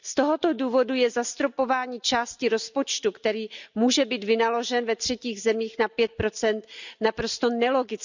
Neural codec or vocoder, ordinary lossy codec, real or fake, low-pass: none; none; real; 7.2 kHz